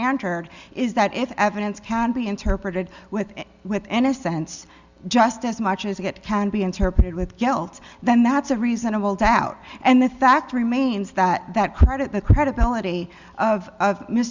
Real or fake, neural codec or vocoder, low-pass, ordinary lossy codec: real; none; 7.2 kHz; Opus, 64 kbps